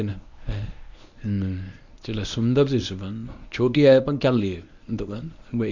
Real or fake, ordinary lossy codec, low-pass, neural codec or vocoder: fake; none; 7.2 kHz; codec, 24 kHz, 0.9 kbps, WavTokenizer, medium speech release version 1